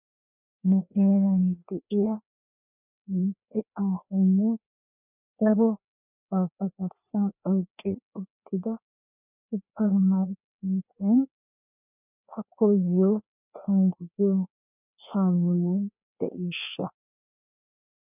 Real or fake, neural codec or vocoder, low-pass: fake; codec, 16 kHz, 2 kbps, FreqCodec, larger model; 3.6 kHz